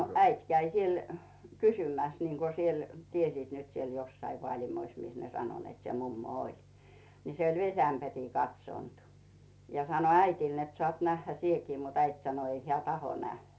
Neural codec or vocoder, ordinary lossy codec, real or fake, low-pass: none; none; real; none